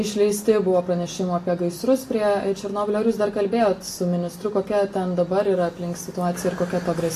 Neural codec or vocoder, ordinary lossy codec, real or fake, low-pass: none; AAC, 48 kbps; real; 14.4 kHz